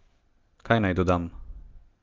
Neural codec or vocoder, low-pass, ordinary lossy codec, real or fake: none; 7.2 kHz; Opus, 24 kbps; real